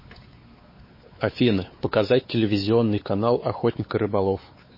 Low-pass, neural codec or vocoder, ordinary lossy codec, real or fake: 5.4 kHz; codec, 16 kHz, 4 kbps, X-Codec, WavLM features, trained on Multilingual LibriSpeech; MP3, 24 kbps; fake